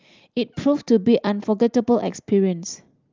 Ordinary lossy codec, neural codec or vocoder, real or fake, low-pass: Opus, 32 kbps; none; real; 7.2 kHz